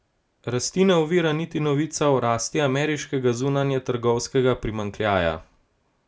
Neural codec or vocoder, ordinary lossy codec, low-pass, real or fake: none; none; none; real